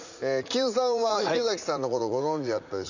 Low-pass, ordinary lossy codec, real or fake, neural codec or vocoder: 7.2 kHz; none; fake; vocoder, 44.1 kHz, 80 mel bands, Vocos